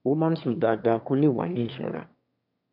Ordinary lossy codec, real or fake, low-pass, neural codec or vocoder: MP3, 48 kbps; fake; 5.4 kHz; autoencoder, 22.05 kHz, a latent of 192 numbers a frame, VITS, trained on one speaker